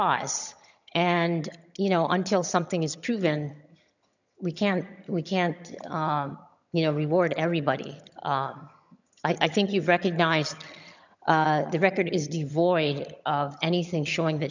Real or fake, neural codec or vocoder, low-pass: fake; vocoder, 22.05 kHz, 80 mel bands, HiFi-GAN; 7.2 kHz